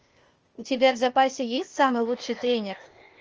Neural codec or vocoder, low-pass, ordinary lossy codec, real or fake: codec, 16 kHz, 0.8 kbps, ZipCodec; 7.2 kHz; Opus, 24 kbps; fake